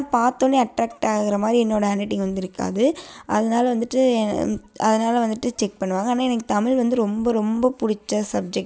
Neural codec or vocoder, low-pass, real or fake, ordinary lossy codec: none; none; real; none